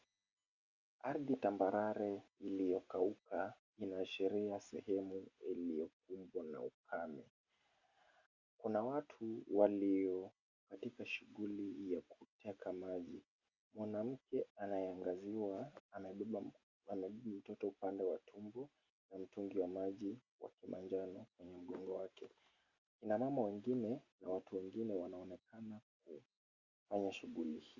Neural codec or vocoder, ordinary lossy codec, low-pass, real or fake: none; Opus, 64 kbps; 7.2 kHz; real